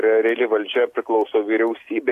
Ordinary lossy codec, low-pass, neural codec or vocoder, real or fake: AAC, 64 kbps; 14.4 kHz; none; real